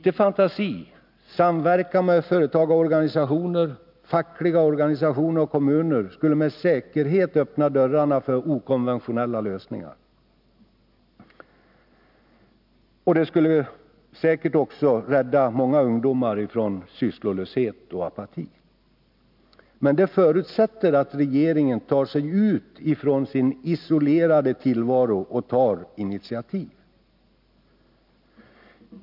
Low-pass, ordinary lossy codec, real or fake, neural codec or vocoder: 5.4 kHz; none; real; none